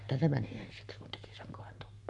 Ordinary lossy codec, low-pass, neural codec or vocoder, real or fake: none; 10.8 kHz; codec, 44.1 kHz, 7.8 kbps, Pupu-Codec; fake